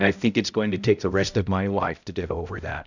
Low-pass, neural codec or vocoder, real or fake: 7.2 kHz; codec, 16 kHz, 0.5 kbps, X-Codec, HuBERT features, trained on balanced general audio; fake